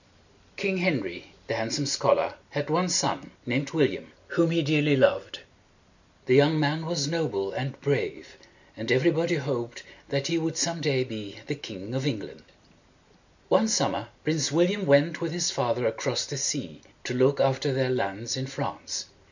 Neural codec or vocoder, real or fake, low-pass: none; real; 7.2 kHz